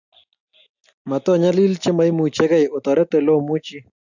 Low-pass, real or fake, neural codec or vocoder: 7.2 kHz; real; none